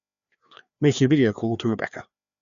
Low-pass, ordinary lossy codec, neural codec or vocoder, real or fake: 7.2 kHz; none; codec, 16 kHz, 2 kbps, FreqCodec, larger model; fake